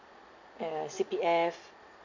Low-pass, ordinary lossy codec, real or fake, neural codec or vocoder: 7.2 kHz; none; real; none